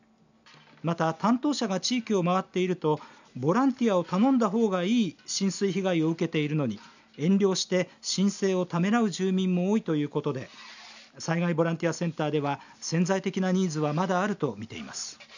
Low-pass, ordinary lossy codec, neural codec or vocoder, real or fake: 7.2 kHz; none; none; real